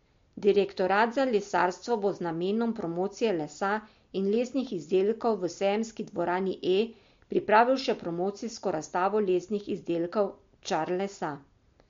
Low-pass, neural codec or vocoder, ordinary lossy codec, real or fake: 7.2 kHz; none; MP3, 48 kbps; real